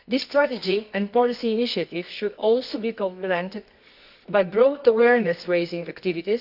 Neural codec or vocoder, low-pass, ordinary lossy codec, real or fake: codec, 24 kHz, 0.9 kbps, WavTokenizer, medium music audio release; 5.4 kHz; none; fake